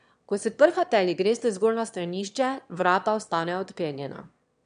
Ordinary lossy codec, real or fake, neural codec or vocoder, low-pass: MP3, 96 kbps; fake; autoencoder, 22.05 kHz, a latent of 192 numbers a frame, VITS, trained on one speaker; 9.9 kHz